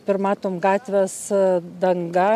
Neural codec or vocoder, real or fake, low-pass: none; real; 14.4 kHz